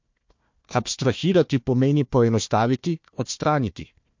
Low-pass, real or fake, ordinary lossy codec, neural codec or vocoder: 7.2 kHz; fake; MP3, 48 kbps; codec, 16 kHz, 1 kbps, FunCodec, trained on Chinese and English, 50 frames a second